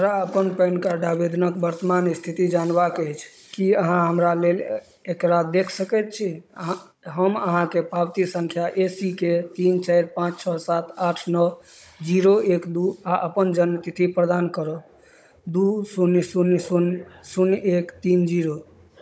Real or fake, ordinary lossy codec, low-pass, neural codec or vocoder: fake; none; none; codec, 16 kHz, 16 kbps, FunCodec, trained on Chinese and English, 50 frames a second